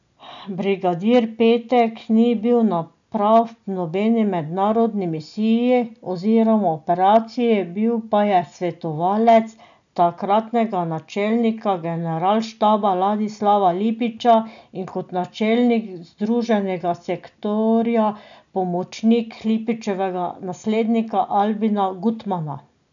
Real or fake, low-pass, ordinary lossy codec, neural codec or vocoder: real; 7.2 kHz; none; none